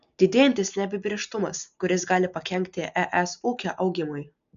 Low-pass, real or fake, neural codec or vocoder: 7.2 kHz; real; none